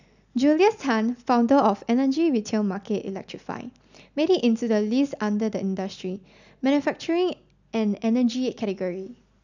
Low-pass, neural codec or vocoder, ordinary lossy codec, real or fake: 7.2 kHz; none; none; real